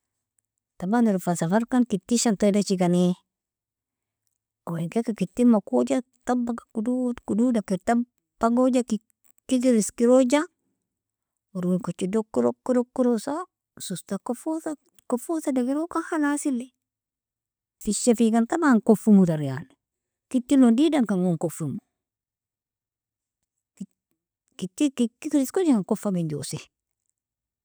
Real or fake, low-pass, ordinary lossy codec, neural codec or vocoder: real; none; none; none